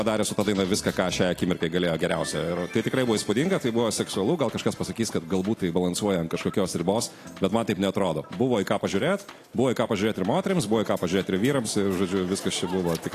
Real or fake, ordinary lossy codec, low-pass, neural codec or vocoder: real; AAC, 48 kbps; 14.4 kHz; none